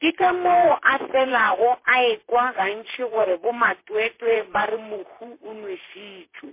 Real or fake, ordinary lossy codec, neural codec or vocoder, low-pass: fake; MP3, 24 kbps; vocoder, 44.1 kHz, 128 mel bands, Pupu-Vocoder; 3.6 kHz